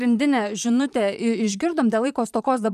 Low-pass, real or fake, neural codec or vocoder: 14.4 kHz; fake; vocoder, 44.1 kHz, 128 mel bands, Pupu-Vocoder